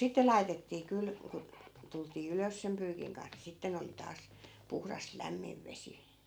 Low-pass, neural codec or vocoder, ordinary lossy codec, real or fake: none; none; none; real